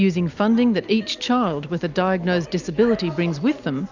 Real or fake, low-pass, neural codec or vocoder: real; 7.2 kHz; none